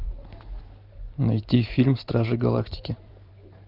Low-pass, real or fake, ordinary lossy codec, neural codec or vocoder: 5.4 kHz; fake; Opus, 24 kbps; vocoder, 44.1 kHz, 128 mel bands every 512 samples, BigVGAN v2